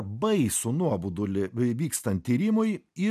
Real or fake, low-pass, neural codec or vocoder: real; 14.4 kHz; none